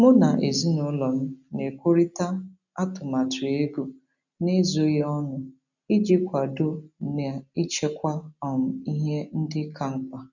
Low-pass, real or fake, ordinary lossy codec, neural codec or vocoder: 7.2 kHz; real; none; none